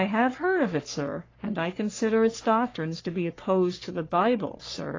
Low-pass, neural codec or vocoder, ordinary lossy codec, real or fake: 7.2 kHz; codec, 44.1 kHz, 3.4 kbps, Pupu-Codec; AAC, 32 kbps; fake